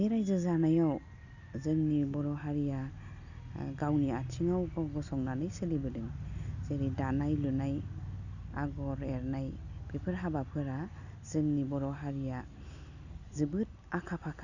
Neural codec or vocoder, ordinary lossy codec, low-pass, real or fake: none; none; 7.2 kHz; real